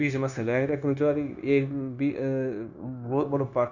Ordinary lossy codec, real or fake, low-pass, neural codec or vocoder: none; fake; 7.2 kHz; codec, 16 kHz, 0.9 kbps, LongCat-Audio-Codec